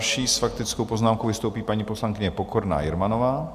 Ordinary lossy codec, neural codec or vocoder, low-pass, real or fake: Opus, 64 kbps; none; 14.4 kHz; real